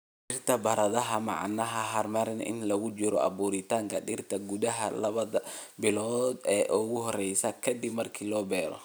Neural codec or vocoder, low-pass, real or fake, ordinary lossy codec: none; none; real; none